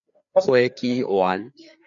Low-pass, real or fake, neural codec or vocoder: 7.2 kHz; fake; codec, 16 kHz, 4 kbps, FreqCodec, larger model